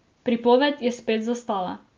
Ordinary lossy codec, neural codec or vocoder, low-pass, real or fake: Opus, 32 kbps; none; 7.2 kHz; real